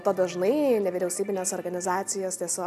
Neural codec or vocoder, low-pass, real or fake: none; 14.4 kHz; real